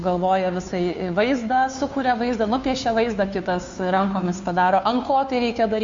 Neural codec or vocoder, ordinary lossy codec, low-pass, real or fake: codec, 16 kHz, 2 kbps, FunCodec, trained on Chinese and English, 25 frames a second; MP3, 48 kbps; 7.2 kHz; fake